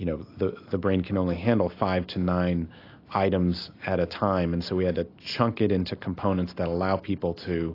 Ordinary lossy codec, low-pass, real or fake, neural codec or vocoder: AAC, 32 kbps; 5.4 kHz; real; none